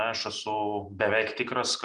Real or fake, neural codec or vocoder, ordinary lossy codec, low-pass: real; none; Opus, 24 kbps; 10.8 kHz